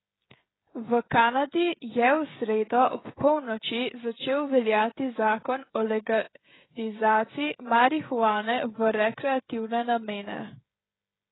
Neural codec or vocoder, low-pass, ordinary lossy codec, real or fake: codec, 16 kHz, 16 kbps, FreqCodec, smaller model; 7.2 kHz; AAC, 16 kbps; fake